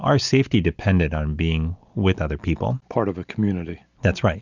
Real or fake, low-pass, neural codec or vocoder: real; 7.2 kHz; none